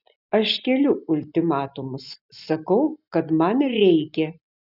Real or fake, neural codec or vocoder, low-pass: real; none; 5.4 kHz